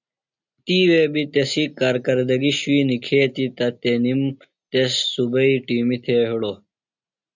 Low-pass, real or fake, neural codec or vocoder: 7.2 kHz; real; none